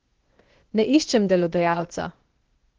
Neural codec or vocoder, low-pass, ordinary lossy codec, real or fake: codec, 16 kHz, 0.8 kbps, ZipCodec; 7.2 kHz; Opus, 16 kbps; fake